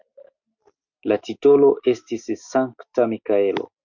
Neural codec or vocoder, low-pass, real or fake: none; 7.2 kHz; real